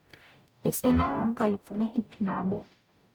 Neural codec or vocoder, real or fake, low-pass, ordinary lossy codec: codec, 44.1 kHz, 0.9 kbps, DAC; fake; none; none